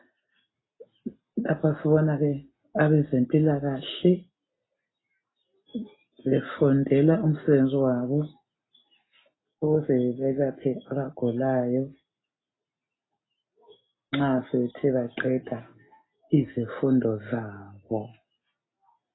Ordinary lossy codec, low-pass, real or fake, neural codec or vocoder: AAC, 16 kbps; 7.2 kHz; real; none